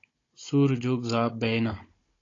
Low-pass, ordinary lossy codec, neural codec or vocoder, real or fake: 7.2 kHz; AAC, 32 kbps; codec, 16 kHz, 16 kbps, FunCodec, trained on Chinese and English, 50 frames a second; fake